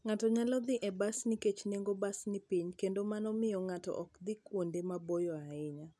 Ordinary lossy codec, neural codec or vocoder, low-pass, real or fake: none; none; none; real